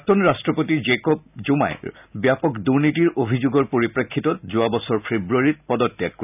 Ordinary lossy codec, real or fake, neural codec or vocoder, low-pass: none; real; none; 3.6 kHz